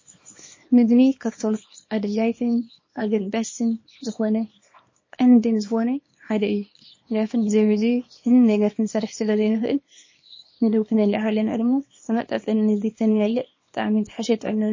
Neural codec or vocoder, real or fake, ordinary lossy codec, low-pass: codec, 24 kHz, 0.9 kbps, WavTokenizer, small release; fake; MP3, 32 kbps; 7.2 kHz